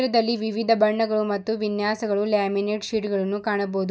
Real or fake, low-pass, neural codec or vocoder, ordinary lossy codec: real; none; none; none